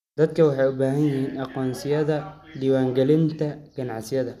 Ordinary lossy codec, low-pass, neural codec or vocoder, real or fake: none; 14.4 kHz; none; real